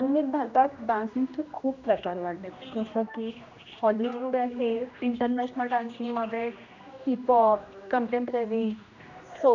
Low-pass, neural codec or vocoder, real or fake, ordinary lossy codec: 7.2 kHz; codec, 16 kHz, 1 kbps, X-Codec, HuBERT features, trained on general audio; fake; none